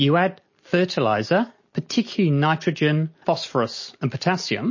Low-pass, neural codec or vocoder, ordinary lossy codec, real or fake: 7.2 kHz; none; MP3, 32 kbps; real